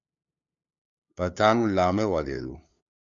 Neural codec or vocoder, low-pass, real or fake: codec, 16 kHz, 2 kbps, FunCodec, trained on LibriTTS, 25 frames a second; 7.2 kHz; fake